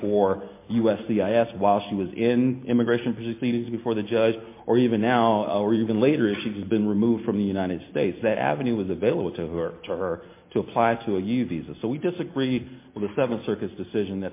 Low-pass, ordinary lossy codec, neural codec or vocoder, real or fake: 3.6 kHz; MP3, 24 kbps; none; real